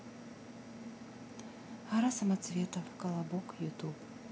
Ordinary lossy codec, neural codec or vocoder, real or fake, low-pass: none; none; real; none